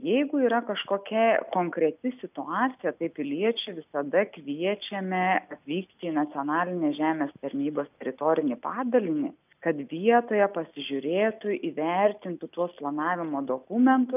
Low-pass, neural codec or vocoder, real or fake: 3.6 kHz; none; real